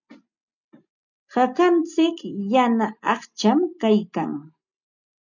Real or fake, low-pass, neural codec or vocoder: real; 7.2 kHz; none